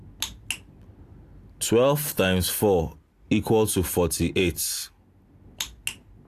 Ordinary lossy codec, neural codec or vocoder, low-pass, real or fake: AAC, 64 kbps; none; 14.4 kHz; real